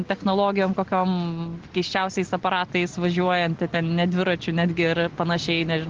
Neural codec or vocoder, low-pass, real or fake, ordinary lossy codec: none; 7.2 kHz; real; Opus, 16 kbps